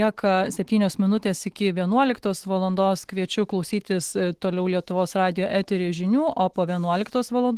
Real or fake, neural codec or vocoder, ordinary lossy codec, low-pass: fake; autoencoder, 48 kHz, 128 numbers a frame, DAC-VAE, trained on Japanese speech; Opus, 16 kbps; 14.4 kHz